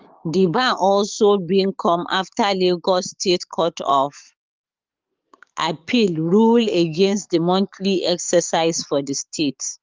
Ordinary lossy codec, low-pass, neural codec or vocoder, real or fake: Opus, 24 kbps; 7.2 kHz; codec, 44.1 kHz, 7.8 kbps, DAC; fake